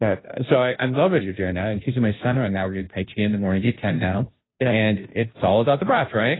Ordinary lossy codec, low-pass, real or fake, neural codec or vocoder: AAC, 16 kbps; 7.2 kHz; fake; codec, 16 kHz, 0.5 kbps, FunCodec, trained on Chinese and English, 25 frames a second